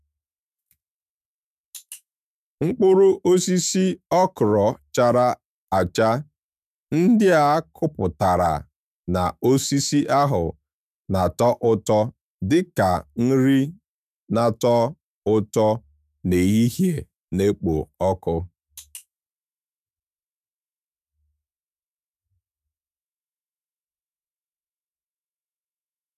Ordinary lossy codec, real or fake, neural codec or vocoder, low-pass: none; fake; autoencoder, 48 kHz, 128 numbers a frame, DAC-VAE, trained on Japanese speech; 14.4 kHz